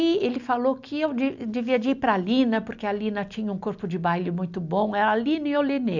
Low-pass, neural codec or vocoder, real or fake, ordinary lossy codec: 7.2 kHz; none; real; none